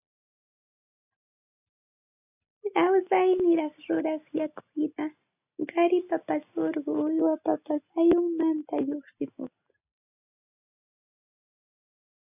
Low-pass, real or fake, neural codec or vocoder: 3.6 kHz; real; none